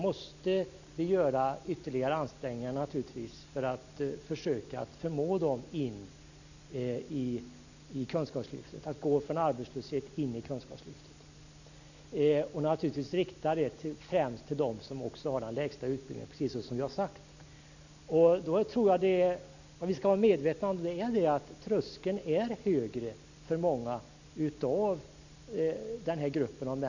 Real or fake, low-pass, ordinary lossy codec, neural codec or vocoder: real; 7.2 kHz; none; none